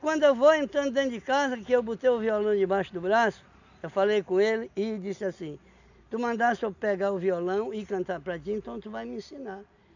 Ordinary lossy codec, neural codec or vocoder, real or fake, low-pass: none; none; real; 7.2 kHz